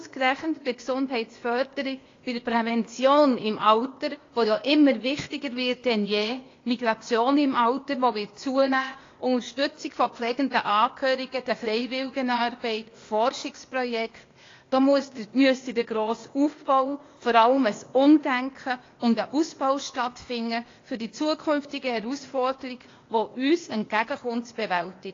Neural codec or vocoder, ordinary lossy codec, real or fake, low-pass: codec, 16 kHz, 0.8 kbps, ZipCodec; AAC, 32 kbps; fake; 7.2 kHz